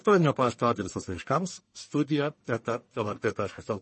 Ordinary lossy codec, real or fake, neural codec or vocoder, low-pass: MP3, 32 kbps; fake; codec, 44.1 kHz, 1.7 kbps, Pupu-Codec; 10.8 kHz